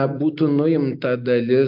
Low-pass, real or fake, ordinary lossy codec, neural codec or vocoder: 5.4 kHz; real; AAC, 48 kbps; none